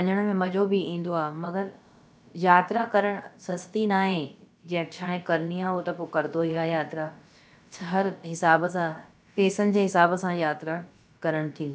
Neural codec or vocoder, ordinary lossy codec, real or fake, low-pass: codec, 16 kHz, about 1 kbps, DyCAST, with the encoder's durations; none; fake; none